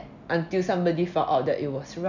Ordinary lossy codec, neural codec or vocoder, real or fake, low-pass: none; none; real; 7.2 kHz